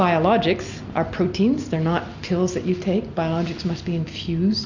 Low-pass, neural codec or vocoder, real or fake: 7.2 kHz; none; real